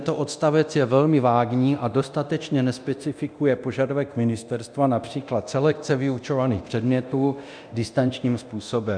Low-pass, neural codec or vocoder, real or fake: 9.9 kHz; codec, 24 kHz, 0.9 kbps, DualCodec; fake